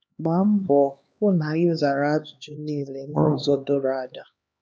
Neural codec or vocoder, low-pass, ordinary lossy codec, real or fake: codec, 16 kHz, 2 kbps, X-Codec, HuBERT features, trained on LibriSpeech; none; none; fake